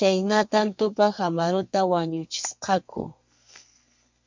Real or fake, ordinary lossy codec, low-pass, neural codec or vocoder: fake; MP3, 64 kbps; 7.2 kHz; codec, 32 kHz, 1.9 kbps, SNAC